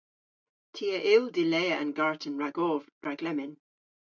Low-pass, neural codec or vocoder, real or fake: 7.2 kHz; none; real